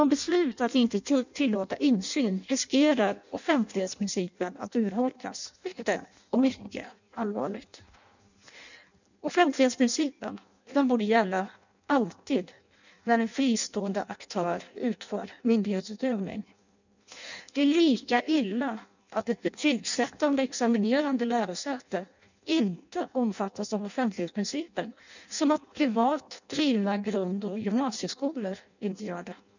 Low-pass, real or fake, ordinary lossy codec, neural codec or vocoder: 7.2 kHz; fake; none; codec, 16 kHz in and 24 kHz out, 0.6 kbps, FireRedTTS-2 codec